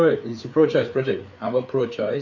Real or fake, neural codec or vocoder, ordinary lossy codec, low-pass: fake; codec, 16 kHz, 4 kbps, FreqCodec, larger model; none; 7.2 kHz